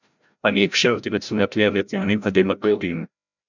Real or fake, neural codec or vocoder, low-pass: fake; codec, 16 kHz, 0.5 kbps, FreqCodec, larger model; 7.2 kHz